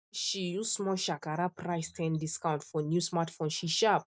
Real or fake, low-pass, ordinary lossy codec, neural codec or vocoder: real; none; none; none